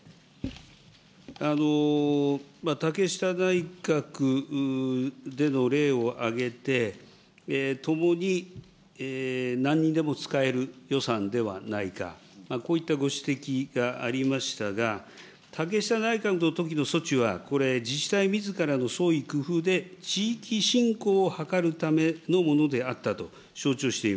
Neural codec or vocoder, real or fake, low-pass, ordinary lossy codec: none; real; none; none